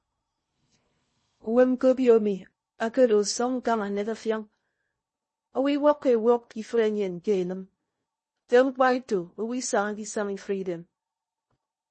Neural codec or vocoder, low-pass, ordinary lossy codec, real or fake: codec, 16 kHz in and 24 kHz out, 0.6 kbps, FocalCodec, streaming, 2048 codes; 10.8 kHz; MP3, 32 kbps; fake